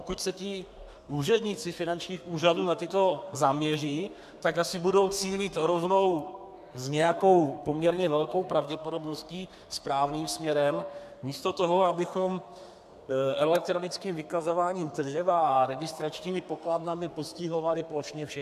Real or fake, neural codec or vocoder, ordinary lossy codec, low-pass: fake; codec, 32 kHz, 1.9 kbps, SNAC; AAC, 96 kbps; 14.4 kHz